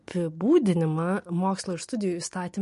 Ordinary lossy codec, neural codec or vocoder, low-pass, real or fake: MP3, 48 kbps; none; 14.4 kHz; real